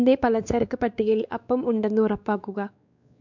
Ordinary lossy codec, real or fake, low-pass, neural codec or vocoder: none; fake; 7.2 kHz; codec, 16 kHz, 2 kbps, X-Codec, WavLM features, trained on Multilingual LibriSpeech